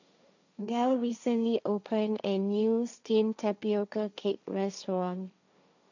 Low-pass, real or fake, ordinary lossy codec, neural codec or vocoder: 7.2 kHz; fake; none; codec, 16 kHz, 1.1 kbps, Voila-Tokenizer